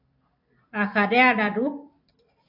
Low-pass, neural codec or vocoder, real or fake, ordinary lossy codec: 5.4 kHz; vocoder, 44.1 kHz, 128 mel bands every 256 samples, BigVGAN v2; fake; AAC, 32 kbps